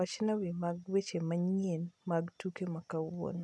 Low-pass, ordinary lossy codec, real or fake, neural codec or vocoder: none; none; real; none